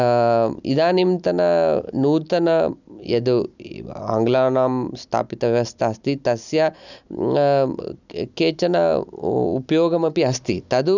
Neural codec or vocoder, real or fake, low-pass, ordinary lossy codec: none; real; 7.2 kHz; none